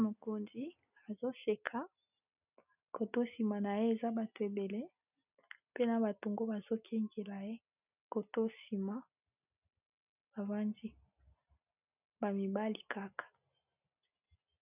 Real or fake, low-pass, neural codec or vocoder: real; 3.6 kHz; none